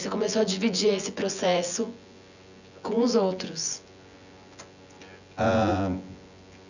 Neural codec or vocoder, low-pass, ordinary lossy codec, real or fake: vocoder, 24 kHz, 100 mel bands, Vocos; 7.2 kHz; none; fake